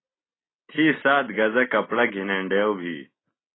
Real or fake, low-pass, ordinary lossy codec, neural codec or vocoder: real; 7.2 kHz; AAC, 16 kbps; none